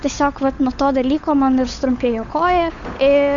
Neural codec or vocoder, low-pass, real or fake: codec, 16 kHz, 8 kbps, FunCodec, trained on Chinese and English, 25 frames a second; 7.2 kHz; fake